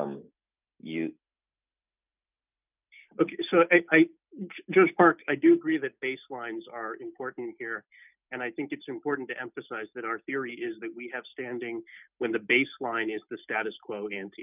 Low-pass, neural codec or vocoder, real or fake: 3.6 kHz; none; real